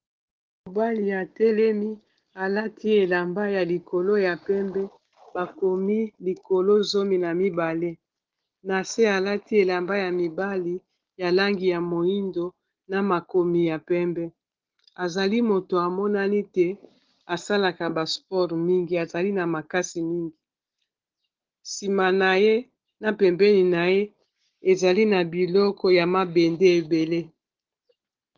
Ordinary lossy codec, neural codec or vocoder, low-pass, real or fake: Opus, 16 kbps; none; 7.2 kHz; real